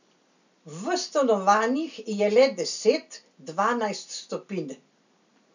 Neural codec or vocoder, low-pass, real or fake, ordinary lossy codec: none; 7.2 kHz; real; none